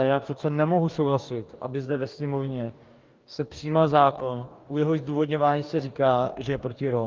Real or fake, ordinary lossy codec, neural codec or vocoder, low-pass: fake; Opus, 24 kbps; codec, 44.1 kHz, 2.6 kbps, DAC; 7.2 kHz